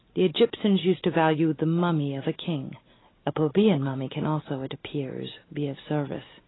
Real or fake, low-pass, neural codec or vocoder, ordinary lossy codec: real; 7.2 kHz; none; AAC, 16 kbps